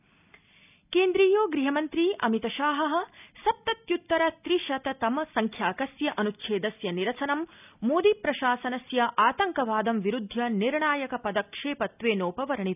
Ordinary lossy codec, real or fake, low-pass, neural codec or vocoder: none; real; 3.6 kHz; none